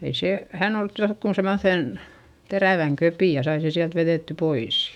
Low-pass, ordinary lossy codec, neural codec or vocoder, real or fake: 19.8 kHz; none; autoencoder, 48 kHz, 128 numbers a frame, DAC-VAE, trained on Japanese speech; fake